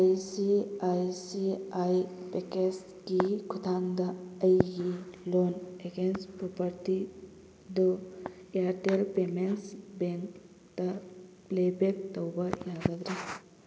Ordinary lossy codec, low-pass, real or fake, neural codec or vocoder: none; none; real; none